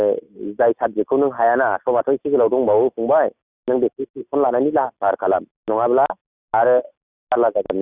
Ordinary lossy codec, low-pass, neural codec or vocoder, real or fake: Opus, 64 kbps; 3.6 kHz; none; real